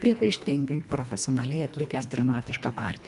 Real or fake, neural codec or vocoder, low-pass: fake; codec, 24 kHz, 1.5 kbps, HILCodec; 10.8 kHz